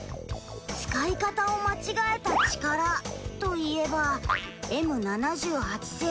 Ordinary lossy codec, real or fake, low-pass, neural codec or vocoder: none; real; none; none